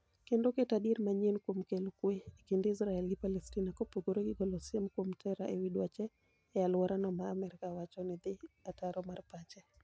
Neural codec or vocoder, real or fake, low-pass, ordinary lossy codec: none; real; none; none